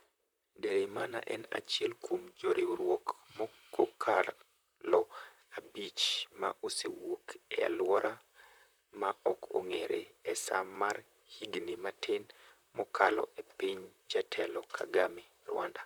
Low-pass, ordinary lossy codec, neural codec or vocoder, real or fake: none; none; vocoder, 44.1 kHz, 128 mel bands, Pupu-Vocoder; fake